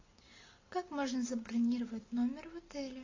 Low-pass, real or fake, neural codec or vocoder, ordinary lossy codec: 7.2 kHz; real; none; AAC, 32 kbps